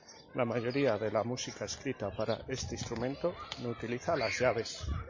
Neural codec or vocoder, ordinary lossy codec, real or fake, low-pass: none; MP3, 32 kbps; real; 7.2 kHz